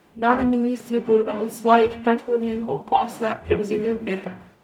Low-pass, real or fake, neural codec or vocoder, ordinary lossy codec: 19.8 kHz; fake; codec, 44.1 kHz, 0.9 kbps, DAC; none